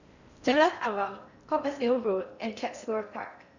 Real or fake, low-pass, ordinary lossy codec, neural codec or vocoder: fake; 7.2 kHz; none; codec, 16 kHz in and 24 kHz out, 0.6 kbps, FocalCodec, streaming, 4096 codes